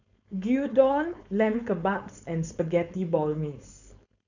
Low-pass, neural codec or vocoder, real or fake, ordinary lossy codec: 7.2 kHz; codec, 16 kHz, 4.8 kbps, FACodec; fake; none